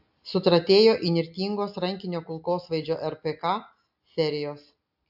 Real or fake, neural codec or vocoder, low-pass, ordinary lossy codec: real; none; 5.4 kHz; AAC, 48 kbps